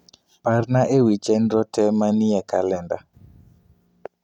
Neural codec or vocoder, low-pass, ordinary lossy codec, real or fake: none; 19.8 kHz; none; real